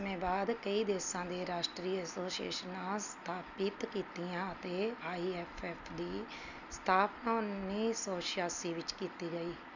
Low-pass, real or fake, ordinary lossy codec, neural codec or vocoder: 7.2 kHz; real; none; none